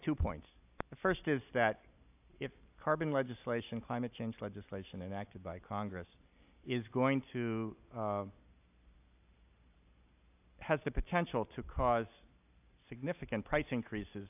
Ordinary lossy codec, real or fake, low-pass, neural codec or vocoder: AAC, 32 kbps; real; 3.6 kHz; none